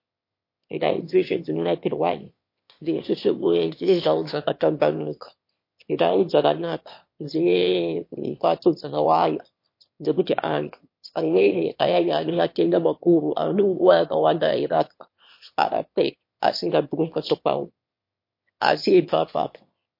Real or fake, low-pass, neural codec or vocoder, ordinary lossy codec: fake; 5.4 kHz; autoencoder, 22.05 kHz, a latent of 192 numbers a frame, VITS, trained on one speaker; MP3, 32 kbps